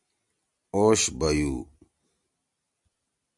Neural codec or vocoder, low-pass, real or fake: none; 10.8 kHz; real